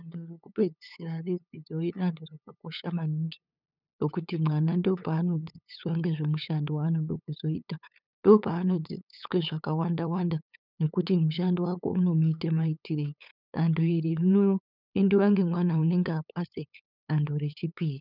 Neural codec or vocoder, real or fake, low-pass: codec, 16 kHz, 8 kbps, FunCodec, trained on LibriTTS, 25 frames a second; fake; 5.4 kHz